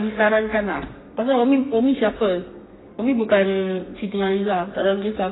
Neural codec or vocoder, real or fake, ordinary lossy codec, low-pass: codec, 32 kHz, 1.9 kbps, SNAC; fake; AAC, 16 kbps; 7.2 kHz